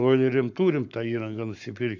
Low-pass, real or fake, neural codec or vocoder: 7.2 kHz; fake; codec, 44.1 kHz, 7.8 kbps, Pupu-Codec